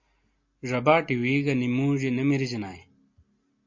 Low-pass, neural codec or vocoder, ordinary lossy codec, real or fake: 7.2 kHz; none; MP3, 48 kbps; real